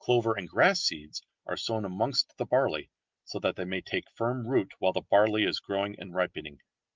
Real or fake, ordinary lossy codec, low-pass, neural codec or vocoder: real; Opus, 32 kbps; 7.2 kHz; none